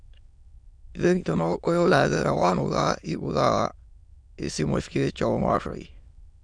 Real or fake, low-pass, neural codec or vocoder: fake; 9.9 kHz; autoencoder, 22.05 kHz, a latent of 192 numbers a frame, VITS, trained on many speakers